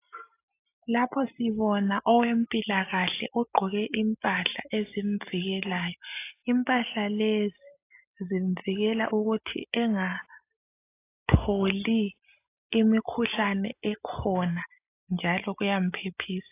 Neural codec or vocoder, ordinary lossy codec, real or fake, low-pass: none; AAC, 24 kbps; real; 3.6 kHz